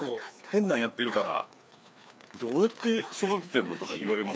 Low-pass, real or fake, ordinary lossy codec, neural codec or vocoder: none; fake; none; codec, 16 kHz, 2 kbps, FreqCodec, larger model